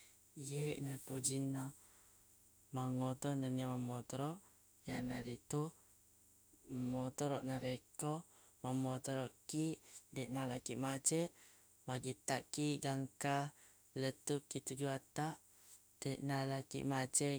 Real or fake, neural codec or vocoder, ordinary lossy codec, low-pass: fake; autoencoder, 48 kHz, 32 numbers a frame, DAC-VAE, trained on Japanese speech; none; none